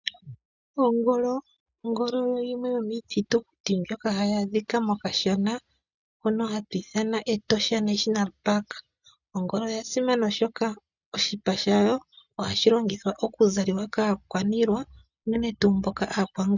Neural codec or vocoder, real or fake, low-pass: none; real; 7.2 kHz